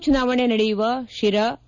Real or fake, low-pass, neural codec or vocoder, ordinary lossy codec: real; 7.2 kHz; none; none